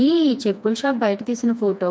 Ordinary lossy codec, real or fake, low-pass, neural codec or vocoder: none; fake; none; codec, 16 kHz, 2 kbps, FreqCodec, smaller model